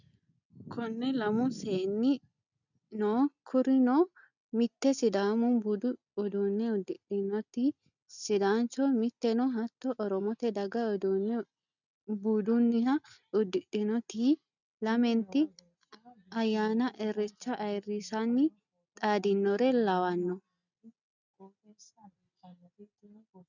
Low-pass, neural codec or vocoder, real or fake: 7.2 kHz; none; real